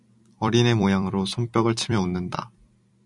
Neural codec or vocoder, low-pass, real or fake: vocoder, 44.1 kHz, 128 mel bands every 256 samples, BigVGAN v2; 10.8 kHz; fake